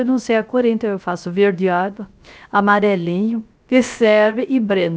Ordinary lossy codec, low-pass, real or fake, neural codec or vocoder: none; none; fake; codec, 16 kHz, 0.3 kbps, FocalCodec